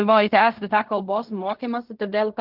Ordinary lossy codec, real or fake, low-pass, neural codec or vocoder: Opus, 32 kbps; fake; 5.4 kHz; codec, 16 kHz in and 24 kHz out, 0.4 kbps, LongCat-Audio-Codec, fine tuned four codebook decoder